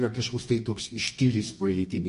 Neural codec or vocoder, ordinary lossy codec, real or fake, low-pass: codec, 24 kHz, 0.9 kbps, WavTokenizer, medium music audio release; MP3, 48 kbps; fake; 10.8 kHz